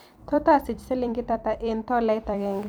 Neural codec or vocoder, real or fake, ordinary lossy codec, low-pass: vocoder, 44.1 kHz, 128 mel bands every 256 samples, BigVGAN v2; fake; none; none